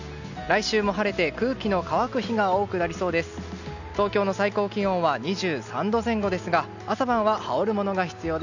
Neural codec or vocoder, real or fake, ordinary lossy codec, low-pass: none; real; none; 7.2 kHz